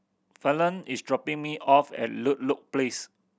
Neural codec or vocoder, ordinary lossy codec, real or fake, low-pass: none; none; real; none